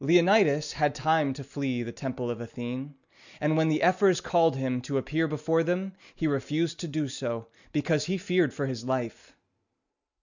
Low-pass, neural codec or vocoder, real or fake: 7.2 kHz; none; real